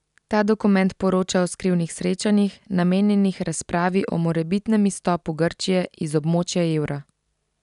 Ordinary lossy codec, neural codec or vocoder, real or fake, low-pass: none; none; real; 10.8 kHz